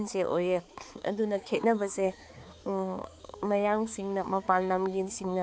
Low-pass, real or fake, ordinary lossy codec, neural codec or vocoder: none; fake; none; codec, 16 kHz, 4 kbps, X-Codec, HuBERT features, trained on balanced general audio